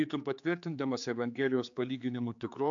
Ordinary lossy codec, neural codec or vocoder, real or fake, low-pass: AAC, 64 kbps; codec, 16 kHz, 4 kbps, X-Codec, HuBERT features, trained on general audio; fake; 7.2 kHz